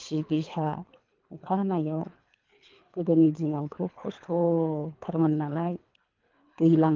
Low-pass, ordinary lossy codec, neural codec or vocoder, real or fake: 7.2 kHz; Opus, 32 kbps; codec, 24 kHz, 3 kbps, HILCodec; fake